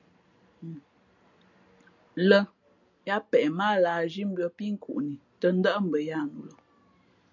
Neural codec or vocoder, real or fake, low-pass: none; real; 7.2 kHz